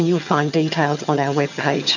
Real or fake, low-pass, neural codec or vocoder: fake; 7.2 kHz; vocoder, 22.05 kHz, 80 mel bands, HiFi-GAN